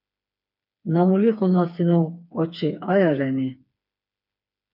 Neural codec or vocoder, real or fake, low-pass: codec, 16 kHz, 4 kbps, FreqCodec, smaller model; fake; 5.4 kHz